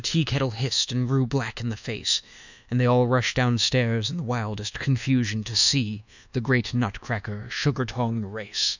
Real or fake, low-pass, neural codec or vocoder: fake; 7.2 kHz; codec, 24 kHz, 1.2 kbps, DualCodec